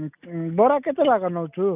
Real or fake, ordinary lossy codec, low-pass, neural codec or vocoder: real; none; 3.6 kHz; none